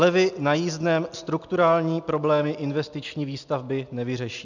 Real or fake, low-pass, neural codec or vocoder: real; 7.2 kHz; none